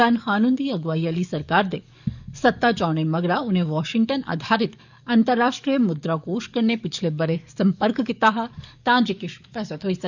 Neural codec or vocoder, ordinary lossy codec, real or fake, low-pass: codec, 44.1 kHz, 7.8 kbps, DAC; none; fake; 7.2 kHz